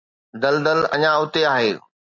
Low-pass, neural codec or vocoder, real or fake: 7.2 kHz; none; real